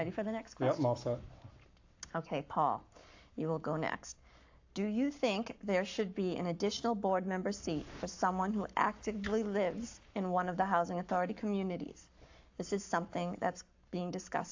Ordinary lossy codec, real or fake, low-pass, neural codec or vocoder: AAC, 48 kbps; fake; 7.2 kHz; codec, 44.1 kHz, 7.8 kbps, Pupu-Codec